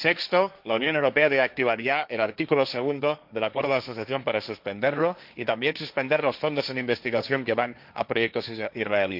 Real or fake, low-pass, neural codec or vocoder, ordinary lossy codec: fake; 5.4 kHz; codec, 16 kHz, 1.1 kbps, Voila-Tokenizer; none